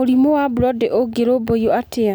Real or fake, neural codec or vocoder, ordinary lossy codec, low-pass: real; none; none; none